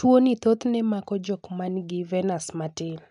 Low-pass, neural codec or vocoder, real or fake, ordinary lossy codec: 10.8 kHz; none; real; none